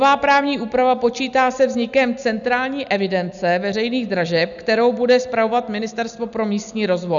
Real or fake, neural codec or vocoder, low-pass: real; none; 7.2 kHz